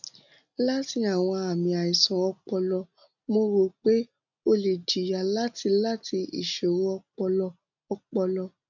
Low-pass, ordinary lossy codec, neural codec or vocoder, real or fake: 7.2 kHz; none; none; real